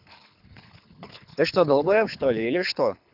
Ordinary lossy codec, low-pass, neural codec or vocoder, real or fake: none; 5.4 kHz; codec, 24 kHz, 6 kbps, HILCodec; fake